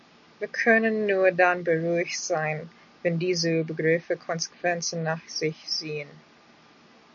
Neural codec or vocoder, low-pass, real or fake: none; 7.2 kHz; real